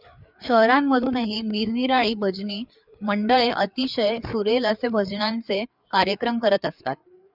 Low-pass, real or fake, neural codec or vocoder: 5.4 kHz; fake; codec, 16 kHz, 4 kbps, FreqCodec, larger model